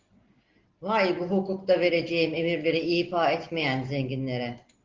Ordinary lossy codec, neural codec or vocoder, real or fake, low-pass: Opus, 16 kbps; none; real; 7.2 kHz